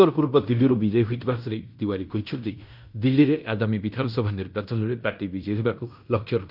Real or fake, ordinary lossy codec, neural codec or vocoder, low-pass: fake; none; codec, 16 kHz in and 24 kHz out, 0.9 kbps, LongCat-Audio-Codec, fine tuned four codebook decoder; 5.4 kHz